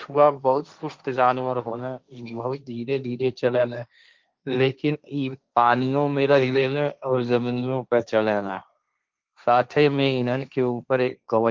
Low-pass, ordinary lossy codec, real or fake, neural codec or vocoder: 7.2 kHz; Opus, 24 kbps; fake; codec, 16 kHz, 1.1 kbps, Voila-Tokenizer